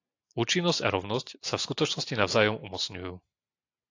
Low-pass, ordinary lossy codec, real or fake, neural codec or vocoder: 7.2 kHz; AAC, 48 kbps; real; none